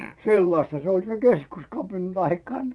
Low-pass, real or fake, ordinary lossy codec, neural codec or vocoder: none; fake; none; vocoder, 22.05 kHz, 80 mel bands, Vocos